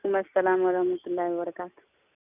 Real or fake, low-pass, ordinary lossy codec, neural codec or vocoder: real; 3.6 kHz; none; none